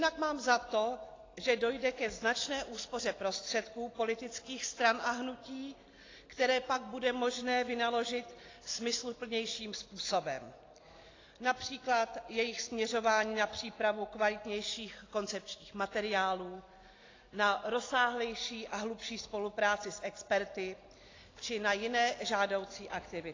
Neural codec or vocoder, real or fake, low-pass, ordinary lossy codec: none; real; 7.2 kHz; AAC, 32 kbps